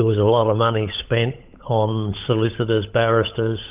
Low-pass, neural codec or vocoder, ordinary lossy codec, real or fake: 3.6 kHz; codec, 16 kHz, 16 kbps, FunCodec, trained on LibriTTS, 50 frames a second; Opus, 24 kbps; fake